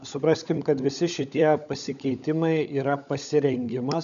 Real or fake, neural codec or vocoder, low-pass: fake; codec, 16 kHz, 16 kbps, FunCodec, trained on LibriTTS, 50 frames a second; 7.2 kHz